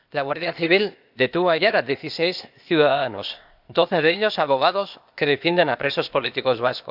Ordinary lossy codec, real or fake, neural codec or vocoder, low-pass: none; fake; codec, 16 kHz, 0.8 kbps, ZipCodec; 5.4 kHz